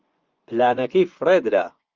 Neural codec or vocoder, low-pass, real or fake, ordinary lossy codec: vocoder, 24 kHz, 100 mel bands, Vocos; 7.2 kHz; fake; Opus, 24 kbps